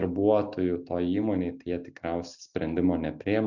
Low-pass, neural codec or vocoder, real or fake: 7.2 kHz; none; real